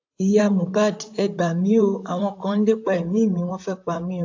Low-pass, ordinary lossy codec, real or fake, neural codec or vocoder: 7.2 kHz; AAC, 48 kbps; fake; vocoder, 44.1 kHz, 128 mel bands, Pupu-Vocoder